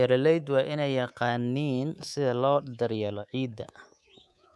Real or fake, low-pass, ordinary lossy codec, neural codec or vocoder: fake; none; none; codec, 24 kHz, 3.1 kbps, DualCodec